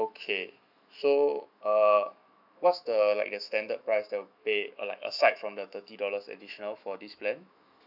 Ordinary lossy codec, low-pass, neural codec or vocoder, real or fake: none; 5.4 kHz; autoencoder, 48 kHz, 128 numbers a frame, DAC-VAE, trained on Japanese speech; fake